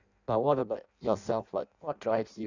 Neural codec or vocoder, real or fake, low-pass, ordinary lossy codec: codec, 16 kHz in and 24 kHz out, 0.6 kbps, FireRedTTS-2 codec; fake; 7.2 kHz; none